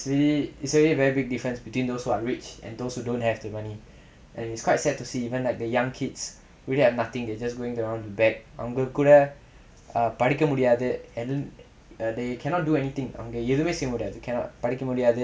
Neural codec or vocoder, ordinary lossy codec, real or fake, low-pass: none; none; real; none